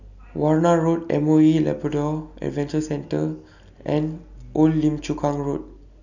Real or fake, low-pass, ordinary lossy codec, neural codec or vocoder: real; 7.2 kHz; none; none